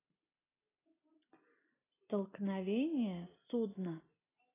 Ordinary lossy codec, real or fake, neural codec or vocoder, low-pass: AAC, 16 kbps; real; none; 3.6 kHz